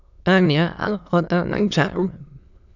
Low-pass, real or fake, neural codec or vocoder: 7.2 kHz; fake; autoencoder, 22.05 kHz, a latent of 192 numbers a frame, VITS, trained on many speakers